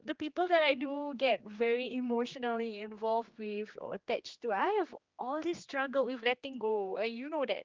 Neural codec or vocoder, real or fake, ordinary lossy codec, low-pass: codec, 16 kHz, 2 kbps, X-Codec, HuBERT features, trained on general audio; fake; Opus, 32 kbps; 7.2 kHz